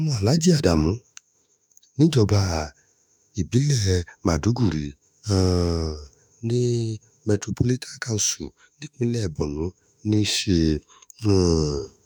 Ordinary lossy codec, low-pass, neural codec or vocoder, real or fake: none; none; autoencoder, 48 kHz, 32 numbers a frame, DAC-VAE, trained on Japanese speech; fake